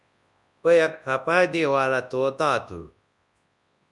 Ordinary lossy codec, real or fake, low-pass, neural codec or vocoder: MP3, 96 kbps; fake; 10.8 kHz; codec, 24 kHz, 0.9 kbps, WavTokenizer, large speech release